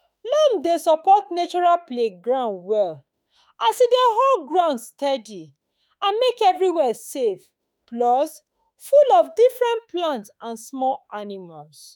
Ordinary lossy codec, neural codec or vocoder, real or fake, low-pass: none; autoencoder, 48 kHz, 32 numbers a frame, DAC-VAE, trained on Japanese speech; fake; none